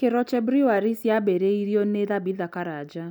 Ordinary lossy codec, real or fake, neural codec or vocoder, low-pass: none; real; none; 19.8 kHz